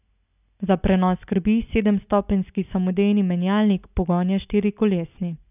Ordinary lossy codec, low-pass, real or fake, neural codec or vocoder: none; 3.6 kHz; real; none